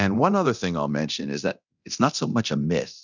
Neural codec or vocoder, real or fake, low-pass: codec, 24 kHz, 0.9 kbps, DualCodec; fake; 7.2 kHz